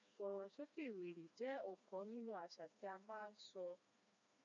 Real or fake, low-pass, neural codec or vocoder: fake; 7.2 kHz; codec, 16 kHz, 2 kbps, FreqCodec, smaller model